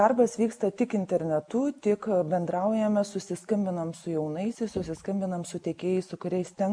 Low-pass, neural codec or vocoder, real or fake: 9.9 kHz; none; real